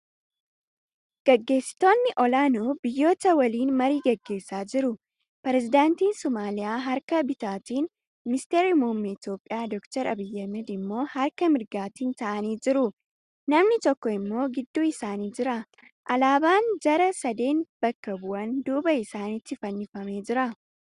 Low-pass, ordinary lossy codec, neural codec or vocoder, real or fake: 10.8 kHz; Opus, 64 kbps; none; real